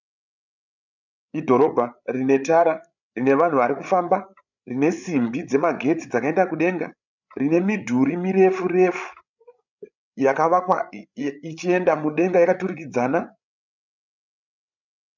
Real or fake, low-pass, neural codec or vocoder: fake; 7.2 kHz; codec, 16 kHz, 8 kbps, FreqCodec, larger model